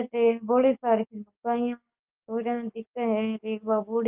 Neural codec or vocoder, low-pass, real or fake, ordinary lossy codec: codec, 44.1 kHz, 7.8 kbps, DAC; 3.6 kHz; fake; Opus, 24 kbps